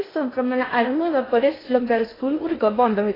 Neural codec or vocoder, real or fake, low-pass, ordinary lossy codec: codec, 16 kHz in and 24 kHz out, 0.6 kbps, FocalCodec, streaming, 2048 codes; fake; 5.4 kHz; AAC, 24 kbps